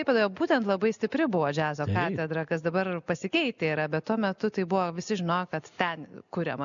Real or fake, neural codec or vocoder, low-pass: real; none; 7.2 kHz